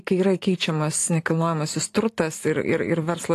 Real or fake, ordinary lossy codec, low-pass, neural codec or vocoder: fake; AAC, 48 kbps; 14.4 kHz; vocoder, 44.1 kHz, 128 mel bands every 512 samples, BigVGAN v2